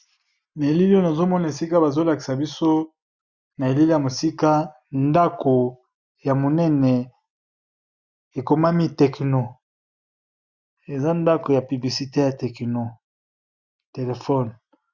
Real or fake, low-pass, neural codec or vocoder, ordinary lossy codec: real; 7.2 kHz; none; Opus, 64 kbps